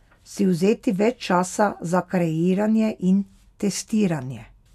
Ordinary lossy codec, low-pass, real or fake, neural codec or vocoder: none; 14.4 kHz; real; none